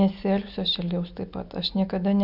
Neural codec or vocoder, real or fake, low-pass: none; real; 5.4 kHz